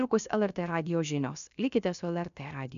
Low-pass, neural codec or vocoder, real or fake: 7.2 kHz; codec, 16 kHz, about 1 kbps, DyCAST, with the encoder's durations; fake